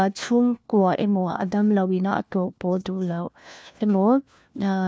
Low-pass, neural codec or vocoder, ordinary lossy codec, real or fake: none; codec, 16 kHz, 1 kbps, FunCodec, trained on LibriTTS, 50 frames a second; none; fake